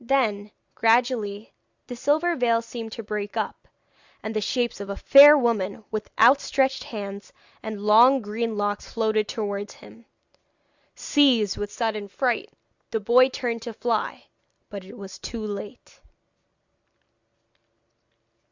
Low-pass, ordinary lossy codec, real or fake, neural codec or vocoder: 7.2 kHz; Opus, 64 kbps; real; none